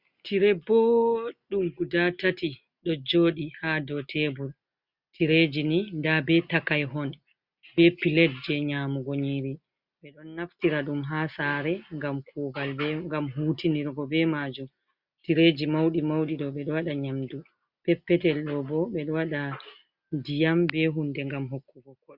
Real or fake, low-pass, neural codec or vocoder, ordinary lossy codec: real; 5.4 kHz; none; Opus, 64 kbps